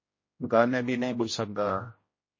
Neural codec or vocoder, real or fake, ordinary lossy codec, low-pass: codec, 16 kHz, 0.5 kbps, X-Codec, HuBERT features, trained on general audio; fake; MP3, 32 kbps; 7.2 kHz